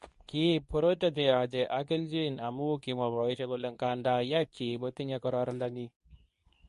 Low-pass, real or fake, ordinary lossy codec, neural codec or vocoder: 10.8 kHz; fake; MP3, 48 kbps; codec, 24 kHz, 0.9 kbps, WavTokenizer, medium speech release version 2